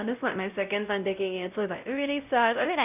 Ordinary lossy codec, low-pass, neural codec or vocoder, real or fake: none; 3.6 kHz; codec, 16 kHz, 0.5 kbps, X-Codec, WavLM features, trained on Multilingual LibriSpeech; fake